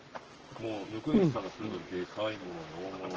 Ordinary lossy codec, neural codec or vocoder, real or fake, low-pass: Opus, 16 kbps; codec, 44.1 kHz, 7.8 kbps, Pupu-Codec; fake; 7.2 kHz